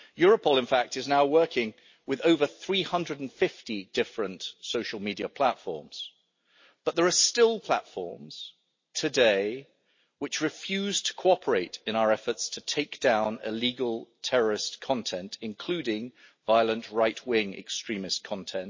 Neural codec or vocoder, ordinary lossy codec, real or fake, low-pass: none; MP3, 32 kbps; real; 7.2 kHz